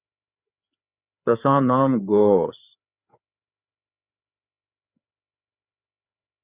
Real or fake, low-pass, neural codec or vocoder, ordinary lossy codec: fake; 3.6 kHz; codec, 16 kHz, 4 kbps, FreqCodec, larger model; Opus, 64 kbps